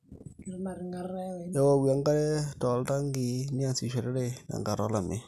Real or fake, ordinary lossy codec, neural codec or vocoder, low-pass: real; none; none; 14.4 kHz